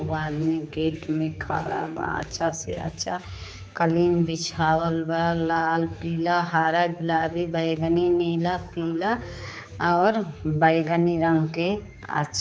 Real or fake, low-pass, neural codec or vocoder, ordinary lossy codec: fake; none; codec, 16 kHz, 4 kbps, X-Codec, HuBERT features, trained on general audio; none